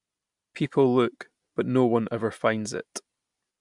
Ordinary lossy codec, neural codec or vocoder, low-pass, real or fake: MP3, 96 kbps; none; 10.8 kHz; real